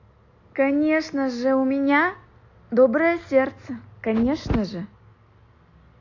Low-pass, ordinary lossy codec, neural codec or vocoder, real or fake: 7.2 kHz; AAC, 48 kbps; none; real